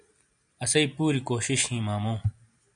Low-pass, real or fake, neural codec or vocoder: 9.9 kHz; real; none